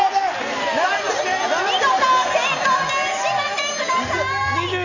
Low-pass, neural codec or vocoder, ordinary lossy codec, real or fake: 7.2 kHz; none; none; real